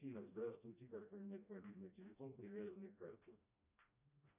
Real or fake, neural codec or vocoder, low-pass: fake; codec, 16 kHz, 1 kbps, FreqCodec, smaller model; 3.6 kHz